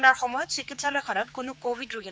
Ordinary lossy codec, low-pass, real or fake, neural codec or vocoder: none; none; fake; codec, 16 kHz, 4 kbps, X-Codec, HuBERT features, trained on general audio